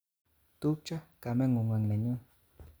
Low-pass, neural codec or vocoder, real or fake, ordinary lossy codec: none; none; real; none